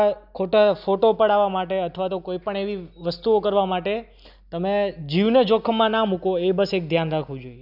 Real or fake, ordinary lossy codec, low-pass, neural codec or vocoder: real; none; 5.4 kHz; none